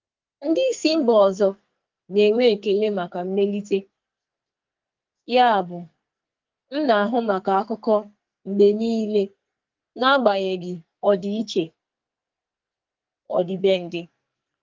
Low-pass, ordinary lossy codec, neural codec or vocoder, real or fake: 7.2 kHz; Opus, 32 kbps; codec, 44.1 kHz, 2.6 kbps, SNAC; fake